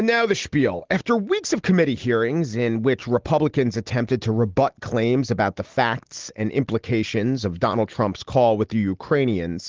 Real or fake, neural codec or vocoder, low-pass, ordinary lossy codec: real; none; 7.2 kHz; Opus, 16 kbps